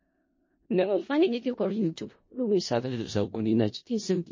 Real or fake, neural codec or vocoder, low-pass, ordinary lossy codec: fake; codec, 16 kHz in and 24 kHz out, 0.4 kbps, LongCat-Audio-Codec, four codebook decoder; 7.2 kHz; MP3, 32 kbps